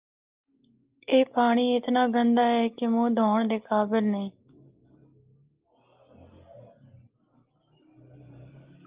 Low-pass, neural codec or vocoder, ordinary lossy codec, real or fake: 3.6 kHz; none; Opus, 32 kbps; real